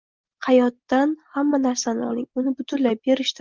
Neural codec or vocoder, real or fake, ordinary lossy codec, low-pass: none; real; Opus, 32 kbps; 7.2 kHz